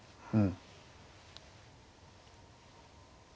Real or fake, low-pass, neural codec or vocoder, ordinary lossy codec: real; none; none; none